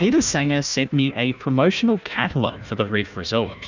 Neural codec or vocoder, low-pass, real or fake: codec, 16 kHz, 1 kbps, FunCodec, trained on Chinese and English, 50 frames a second; 7.2 kHz; fake